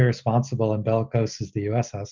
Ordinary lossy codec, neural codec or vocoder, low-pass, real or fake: MP3, 64 kbps; none; 7.2 kHz; real